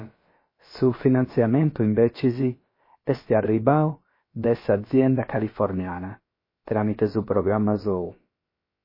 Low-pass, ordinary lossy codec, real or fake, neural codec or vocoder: 5.4 kHz; MP3, 24 kbps; fake; codec, 16 kHz, about 1 kbps, DyCAST, with the encoder's durations